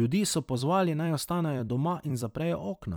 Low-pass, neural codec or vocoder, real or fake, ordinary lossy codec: none; vocoder, 44.1 kHz, 128 mel bands every 256 samples, BigVGAN v2; fake; none